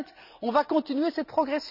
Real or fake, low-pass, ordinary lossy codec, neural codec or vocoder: real; 5.4 kHz; none; none